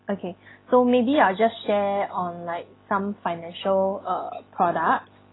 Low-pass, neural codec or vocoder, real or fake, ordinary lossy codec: 7.2 kHz; none; real; AAC, 16 kbps